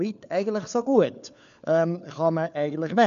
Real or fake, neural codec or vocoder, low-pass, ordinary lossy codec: fake; codec, 16 kHz, 4 kbps, FunCodec, trained on LibriTTS, 50 frames a second; 7.2 kHz; none